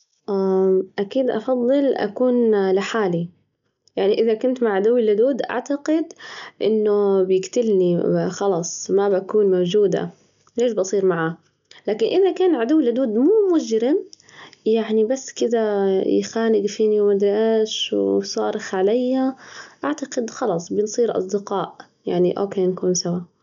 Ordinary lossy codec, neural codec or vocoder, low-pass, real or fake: none; none; 7.2 kHz; real